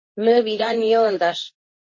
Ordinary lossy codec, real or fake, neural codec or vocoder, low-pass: MP3, 32 kbps; fake; codec, 24 kHz, 0.9 kbps, WavTokenizer, medium speech release version 2; 7.2 kHz